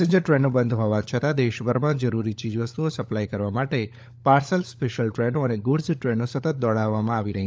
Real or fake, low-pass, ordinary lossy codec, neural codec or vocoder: fake; none; none; codec, 16 kHz, 16 kbps, FunCodec, trained on LibriTTS, 50 frames a second